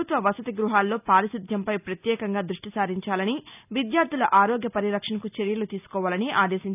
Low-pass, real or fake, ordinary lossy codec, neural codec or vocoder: 3.6 kHz; real; none; none